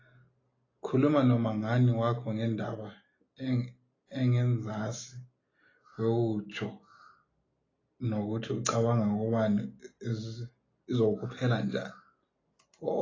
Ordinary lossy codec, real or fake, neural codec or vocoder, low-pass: AAC, 32 kbps; real; none; 7.2 kHz